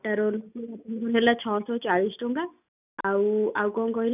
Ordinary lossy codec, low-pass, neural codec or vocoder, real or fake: none; 3.6 kHz; none; real